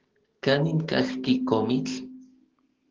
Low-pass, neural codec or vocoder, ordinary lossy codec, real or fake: 7.2 kHz; codec, 44.1 kHz, 7.8 kbps, DAC; Opus, 16 kbps; fake